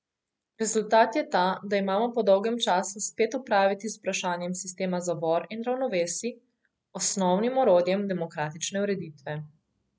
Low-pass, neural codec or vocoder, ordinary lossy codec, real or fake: none; none; none; real